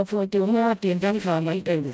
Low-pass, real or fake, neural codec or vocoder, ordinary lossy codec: none; fake; codec, 16 kHz, 0.5 kbps, FreqCodec, smaller model; none